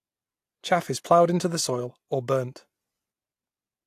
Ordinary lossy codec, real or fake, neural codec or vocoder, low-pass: AAC, 64 kbps; real; none; 14.4 kHz